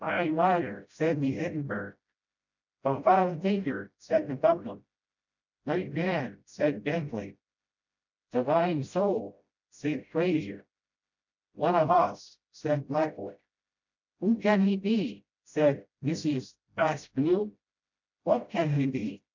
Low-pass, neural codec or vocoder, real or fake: 7.2 kHz; codec, 16 kHz, 0.5 kbps, FreqCodec, smaller model; fake